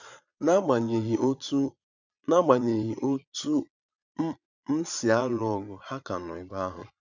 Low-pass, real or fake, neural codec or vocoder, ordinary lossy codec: 7.2 kHz; fake; vocoder, 22.05 kHz, 80 mel bands, Vocos; none